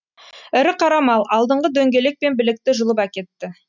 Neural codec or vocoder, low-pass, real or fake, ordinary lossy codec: none; 7.2 kHz; real; none